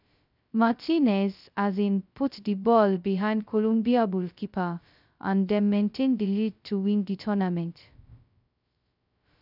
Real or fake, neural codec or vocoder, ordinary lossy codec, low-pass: fake; codec, 16 kHz, 0.2 kbps, FocalCodec; none; 5.4 kHz